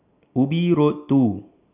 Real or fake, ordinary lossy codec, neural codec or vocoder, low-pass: real; none; none; 3.6 kHz